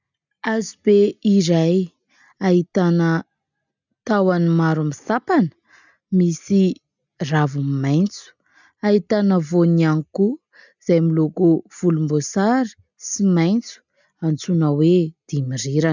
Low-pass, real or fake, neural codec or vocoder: 7.2 kHz; real; none